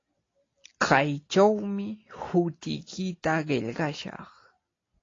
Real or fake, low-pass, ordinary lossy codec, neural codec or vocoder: real; 7.2 kHz; AAC, 32 kbps; none